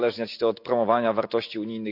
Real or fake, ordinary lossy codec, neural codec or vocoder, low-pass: real; none; none; 5.4 kHz